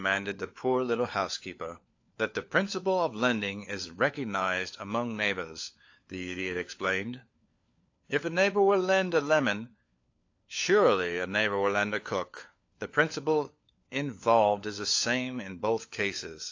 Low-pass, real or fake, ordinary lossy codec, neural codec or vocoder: 7.2 kHz; fake; AAC, 48 kbps; codec, 16 kHz, 4 kbps, FunCodec, trained on LibriTTS, 50 frames a second